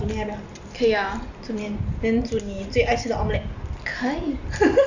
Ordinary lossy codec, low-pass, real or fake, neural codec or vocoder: Opus, 64 kbps; 7.2 kHz; real; none